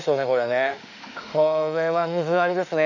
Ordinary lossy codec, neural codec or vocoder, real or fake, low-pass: none; autoencoder, 48 kHz, 32 numbers a frame, DAC-VAE, trained on Japanese speech; fake; 7.2 kHz